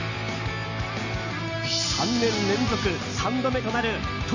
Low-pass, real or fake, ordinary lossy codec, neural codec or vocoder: 7.2 kHz; real; none; none